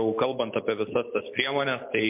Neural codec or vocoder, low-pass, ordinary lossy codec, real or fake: none; 3.6 kHz; MP3, 32 kbps; real